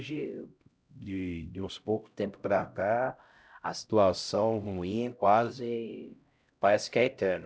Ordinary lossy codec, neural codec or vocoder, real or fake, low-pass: none; codec, 16 kHz, 0.5 kbps, X-Codec, HuBERT features, trained on LibriSpeech; fake; none